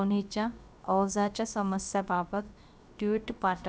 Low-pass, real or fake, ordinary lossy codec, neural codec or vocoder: none; fake; none; codec, 16 kHz, about 1 kbps, DyCAST, with the encoder's durations